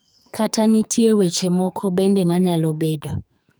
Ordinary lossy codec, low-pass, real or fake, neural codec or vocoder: none; none; fake; codec, 44.1 kHz, 2.6 kbps, SNAC